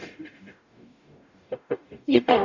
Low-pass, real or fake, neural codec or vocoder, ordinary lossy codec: 7.2 kHz; fake; codec, 44.1 kHz, 0.9 kbps, DAC; none